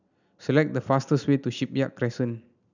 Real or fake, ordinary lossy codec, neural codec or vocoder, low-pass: real; none; none; 7.2 kHz